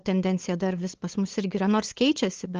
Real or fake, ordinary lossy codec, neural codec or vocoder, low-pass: fake; Opus, 16 kbps; codec, 16 kHz, 8 kbps, FunCodec, trained on LibriTTS, 25 frames a second; 7.2 kHz